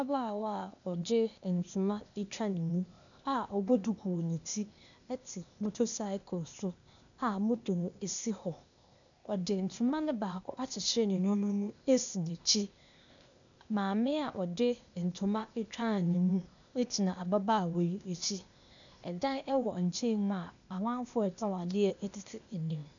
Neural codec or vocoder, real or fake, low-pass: codec, 16 kHz, 0.8 kbps, ZipCodec; fake; 7.2 kHz